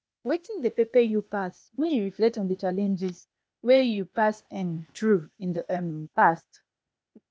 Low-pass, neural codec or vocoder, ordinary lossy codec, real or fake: none; codec, 16 kHz, 0.8 kbps, ZipCodec; none; fake